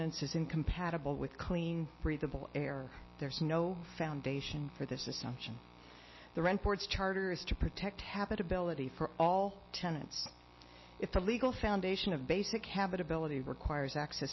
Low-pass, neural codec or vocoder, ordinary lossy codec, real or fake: 7.2 kHz; none; MP3, 24 kbps; real